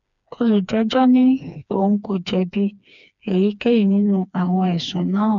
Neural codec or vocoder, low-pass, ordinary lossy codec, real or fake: codec, 16 kHz, 2 kbps, FreqCodec, smaller model; 7.2 kHz; none; fake